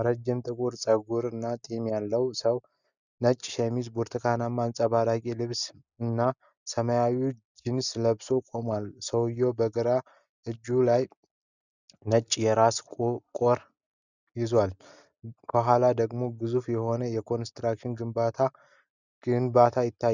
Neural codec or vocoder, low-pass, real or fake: none; 7.2 kHz; real